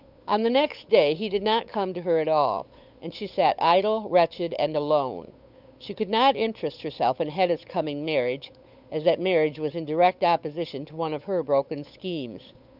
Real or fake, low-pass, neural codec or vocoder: fake; 5.4 kHz; codec, 16 kHz, 8 kbps, FunCodec, trained on Chinese and English, 25 frames a second